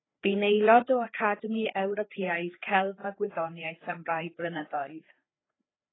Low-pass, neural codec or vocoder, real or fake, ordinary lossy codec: 7.2 kHz; codec, 16 kHz, 4 kbps, FreqCodec, larger model; fake; AAC, 16 kbps